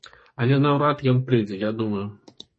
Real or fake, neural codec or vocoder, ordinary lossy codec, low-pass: fake; codec, 44.1 kHz, 2.6 kbps, SNAC; MP3, 32 kbps; 10.8 kHz